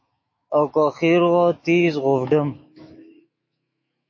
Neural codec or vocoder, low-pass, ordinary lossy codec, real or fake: autoencoder, 48 kHz, 128 numbers a frame, DAC-VAE, trained on Japanese speech; 7.2 kHz; MP3, 32 kbps; fake